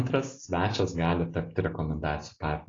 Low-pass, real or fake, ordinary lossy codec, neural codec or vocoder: 7.2 kHz; real; AAC, 32 kbps; none